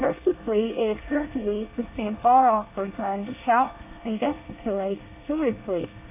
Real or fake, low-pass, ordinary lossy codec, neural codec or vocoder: fake; 3.6 kHz; AAC, 32 kbps; codec, 24 kHz, 1 kbps, SNAC